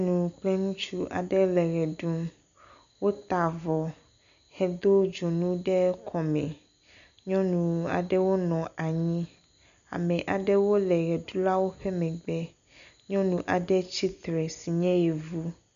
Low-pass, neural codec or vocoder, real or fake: 7.2 kHz; none; real